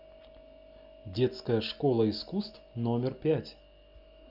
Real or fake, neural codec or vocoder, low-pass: real; none; 5.4 kHz